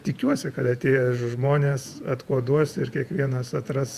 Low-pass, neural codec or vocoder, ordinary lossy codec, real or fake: 14.4 kHz; vocoder, 48 kHz, 128 mel bands, Vocos; Opus, 64 kbps; fake